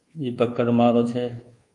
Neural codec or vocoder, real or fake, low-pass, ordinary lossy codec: codec, 24 kHz, 1.2 kbps, DualCodec; fake; 10.8 kHz; Opus, 32 kbps